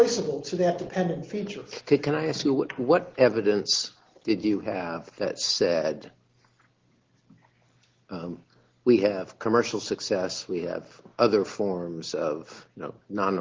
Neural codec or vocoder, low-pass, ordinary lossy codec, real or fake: none; 7.2 kHz; Opus, 16 kbps; real